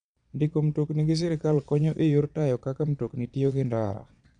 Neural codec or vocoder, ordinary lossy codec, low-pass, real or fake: vocoder, 22.05 kHz, 80 mel bands, Vocos; none; 9.9 kHz; fake